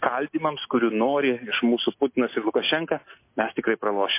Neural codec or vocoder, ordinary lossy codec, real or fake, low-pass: none; MP3, 24 kbps; real; 3.6 kHz